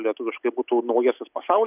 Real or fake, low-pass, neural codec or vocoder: real; 3.6 kHz; none